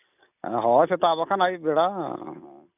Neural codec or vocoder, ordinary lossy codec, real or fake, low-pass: none; none; real; 3.6 kHz